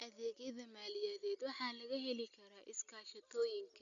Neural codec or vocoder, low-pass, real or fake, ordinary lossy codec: none; 7.2 kHz; real; none